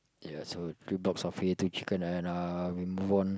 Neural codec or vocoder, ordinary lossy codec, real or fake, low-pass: none; none; real; none